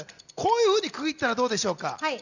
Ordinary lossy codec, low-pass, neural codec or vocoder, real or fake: none; 7.2 kHz; none; real